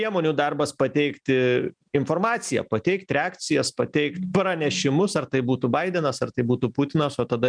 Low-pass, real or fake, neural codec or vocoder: 9.9 kHz; real; none